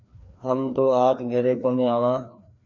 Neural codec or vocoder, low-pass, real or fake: codec, 16 kHz, 2 kbps, FreqCodec, larger model; 7.2 kHz; fake